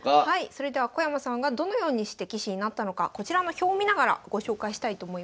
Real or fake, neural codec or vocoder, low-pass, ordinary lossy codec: real; none; none; none